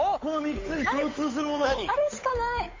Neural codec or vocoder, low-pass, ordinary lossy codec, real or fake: codec, 16 kHz, 8 kbps, FunCodec, trained on Chinese and English, 25 frames a second; 7.2 kHz; MP3, 32 kbps; fake